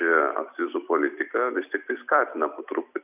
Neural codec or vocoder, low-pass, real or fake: vocoder, 44.1 kHz, 128 mel bands every 256 samples, BigVGAN v2; 3.6 kHz; fake